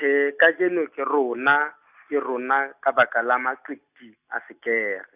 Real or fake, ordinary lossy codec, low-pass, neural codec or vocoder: real; none; 3.6 kHz; none